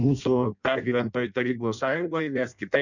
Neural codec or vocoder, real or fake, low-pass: codec, 16 kHz in and 24 kHz out, 0.6 kbps, FireRedTTS-2 codec; fake; 7.2 kHz